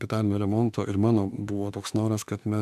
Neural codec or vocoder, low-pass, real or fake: autoencoder, 48 kHz, 32 numbers a frame, DAC-VAE, trained on Japanese speech; 14.4 kHz; fake